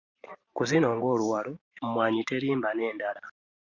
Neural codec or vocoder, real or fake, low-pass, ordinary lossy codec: vocoder, 44.1 kHz, 128 mel bands every 512 samples, BigVGAN v2; fake; 7.2 kHz; Opus, 64 kbps